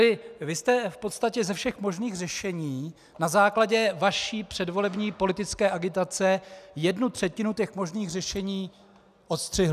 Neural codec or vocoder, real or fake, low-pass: none; real; 14.4 kHz